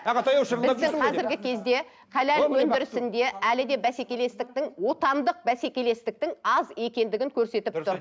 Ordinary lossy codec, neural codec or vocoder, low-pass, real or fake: none; none; none; real